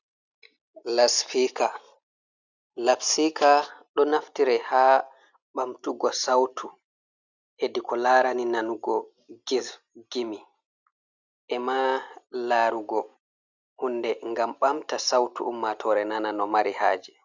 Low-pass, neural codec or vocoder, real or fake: 7.2 kHz; none; real